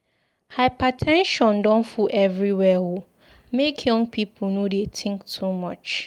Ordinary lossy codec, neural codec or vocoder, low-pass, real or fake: Opus, 32 kbps; none; 19.8 kHz; real